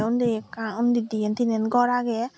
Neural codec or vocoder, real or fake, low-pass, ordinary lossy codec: none; real; none; none